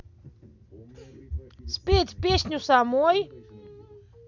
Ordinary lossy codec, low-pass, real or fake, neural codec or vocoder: none; 7.2 kHz; real; none